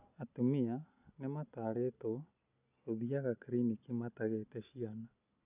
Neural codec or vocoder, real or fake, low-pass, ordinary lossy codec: none; real; 3.6 kHz; none